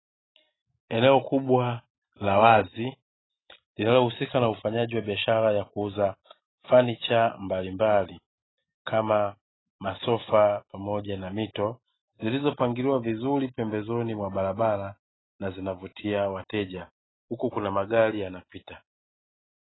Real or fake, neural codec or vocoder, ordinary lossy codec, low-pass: real; none; AAC, 16 kbps; 7.2 kHz